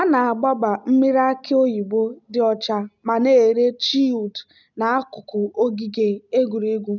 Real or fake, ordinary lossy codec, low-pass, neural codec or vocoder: real; none; 7.2 kHz; none